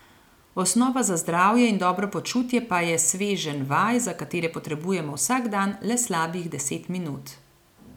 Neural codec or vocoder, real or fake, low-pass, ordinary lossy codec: none; real; 19.8 kHz; none